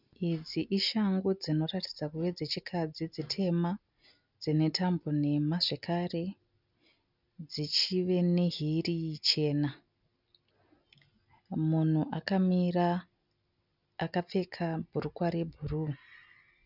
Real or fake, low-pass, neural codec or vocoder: real; 5.4 kHz; none